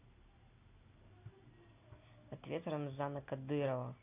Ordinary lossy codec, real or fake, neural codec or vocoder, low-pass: AAC, 24 kbps; real; none; 3.6 kHz